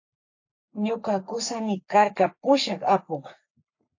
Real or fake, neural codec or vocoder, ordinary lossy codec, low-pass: fake; codec, 44.1 kHz, 3.4 kbps, Pupu-Codec; AAC, 48 kbps; 7.2 kHz